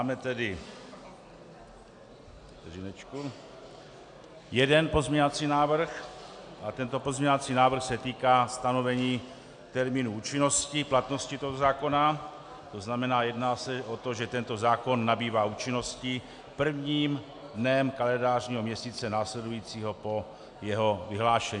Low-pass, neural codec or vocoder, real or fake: 9.9 kHz; none; real